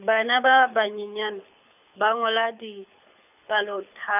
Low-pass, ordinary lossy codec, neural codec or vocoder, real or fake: 3.6 kHz; none; codec, 24 kHz, 6 kbps, HILCodec; fake